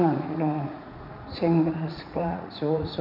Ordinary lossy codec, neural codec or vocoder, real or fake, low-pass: none; vocoder, 44.1 kHz, 80 mel bands, Vocos; fake; 5.4 kHz